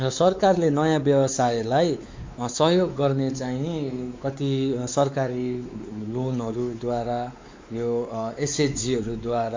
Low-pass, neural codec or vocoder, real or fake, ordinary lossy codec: 7.2 kHz; codec, 16 kHz, 4 kbps, X-Codec, WavLM features, trained on Multilingual LibriSpeech; fake; MP3, 64 kbps